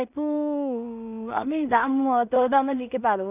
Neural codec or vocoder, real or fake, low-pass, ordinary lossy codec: codec, 16 kHz in and 24 kHz out, 0.4 kbps, LongCat-Audio-Codec, two codebook decoder; fake; 3.6 kHz; none